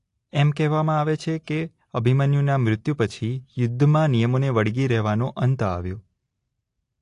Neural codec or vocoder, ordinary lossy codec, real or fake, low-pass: none; AAC, 48 kbps; real; 10.8 kHz